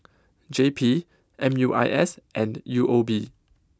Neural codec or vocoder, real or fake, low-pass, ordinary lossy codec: none; real; none; none